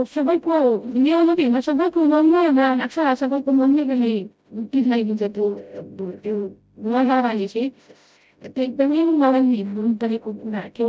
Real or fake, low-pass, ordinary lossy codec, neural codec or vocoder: fake; none; none; codec, 16 kHz, 0.5 kbps, FreqCodec, smaller model